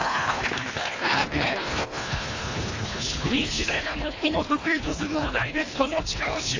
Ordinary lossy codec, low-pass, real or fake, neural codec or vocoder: AAC, 32 kbps; 7.2 kHz; fake; codec, 24 kHz, 1.5 kbps, HILCodec